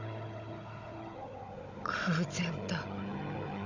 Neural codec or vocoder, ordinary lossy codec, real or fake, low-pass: codec, 16 kHz, 16 kbps, FunCodec, trained on Chinese and English, 50 frames a second; MP3, 64 kbps; fake; 7.2 kHz